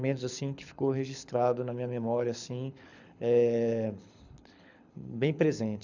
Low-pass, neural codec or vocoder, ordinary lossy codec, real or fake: 7.2 kHz; codec, 24 kHz, 6 kbps, HILCodec; none; fake